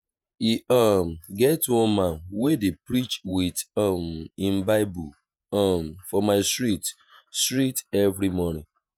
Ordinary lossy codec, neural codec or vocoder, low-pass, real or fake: none; vocoder, 48 kHz, 128 mel bands, Vocos; none; fake